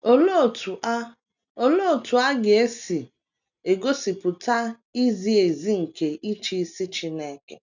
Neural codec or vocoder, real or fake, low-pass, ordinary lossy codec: none; real; 7.2 kHz; none